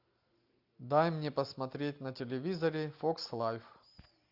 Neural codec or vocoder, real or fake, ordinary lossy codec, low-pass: none; real; AAC, 48 kbps; 5.4 kHz